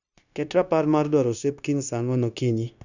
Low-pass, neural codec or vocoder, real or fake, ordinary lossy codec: 7.2 kHz; codec, 16 kHz, 0.9 kbps, LongCat-Audio-Codec; fake; none